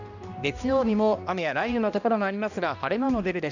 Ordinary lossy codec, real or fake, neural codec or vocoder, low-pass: none; fake; codec, 16 kHz, 1 kbps, X-Codec, HuBERT features, trained on balanced general audio; 7.2 kHz